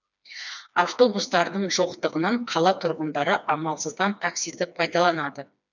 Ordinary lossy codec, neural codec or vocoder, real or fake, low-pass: none; codec, 16 kHz, 2 kbps, FreqCodec, smaller model; fake; 7.2 kHz